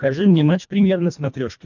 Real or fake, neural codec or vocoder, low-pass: fake; codec, 24 kHz, 1.5 kbps, HILCodec; 7.2 kHz